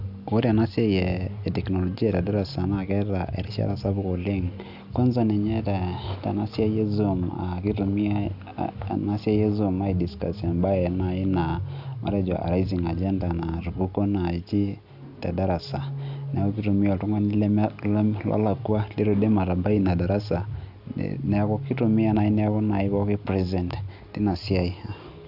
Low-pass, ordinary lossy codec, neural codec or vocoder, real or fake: 5.4 kHz; Opus, 64 kbps; none; real